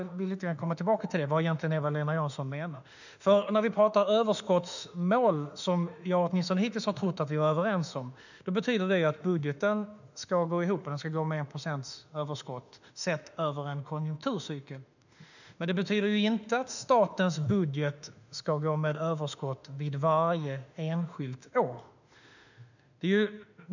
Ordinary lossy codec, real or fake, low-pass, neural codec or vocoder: none; fake; 7.2 kHz; autoencoder, 48 kHz, 32 numbers a frame, DAC-VAE, trained on Japanese speech